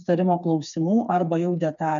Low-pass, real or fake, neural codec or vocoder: 7.2 kHz; fake; codec, 16 kHz, 4 kbps, FreqCodec, smaller model